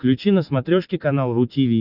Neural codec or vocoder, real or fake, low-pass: none; real; 5.4 kHz